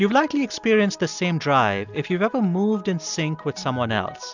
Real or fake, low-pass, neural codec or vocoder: real; 7.2 kHz; none